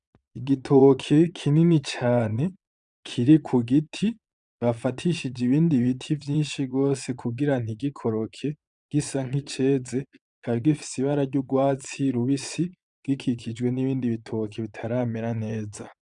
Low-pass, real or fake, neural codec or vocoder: 9.9 kHz; fake; vocoder, 22.05 kHz, 80 mel bands, Vocos